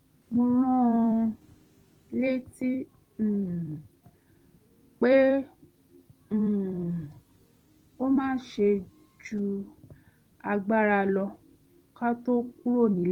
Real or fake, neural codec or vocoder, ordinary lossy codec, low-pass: fake; vocoder, 44.1 kHz, 128 mel bands every 256 samples, BigVGAN v2; Opus, 24 kbps; 19.8 kHz